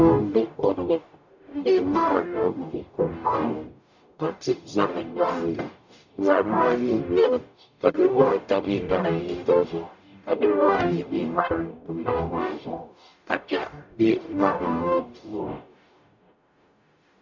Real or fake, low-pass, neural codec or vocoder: fake; 7.2 kHz; codec, 44.1 kHz, 0.9 kbps, DAC